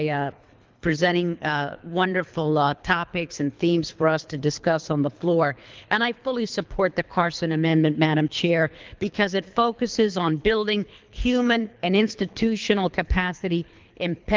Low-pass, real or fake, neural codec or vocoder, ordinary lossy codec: 7.2 kHz; fake; codec, 24 kHz, 3 kbps, HILCodec; Opus, 32 kbps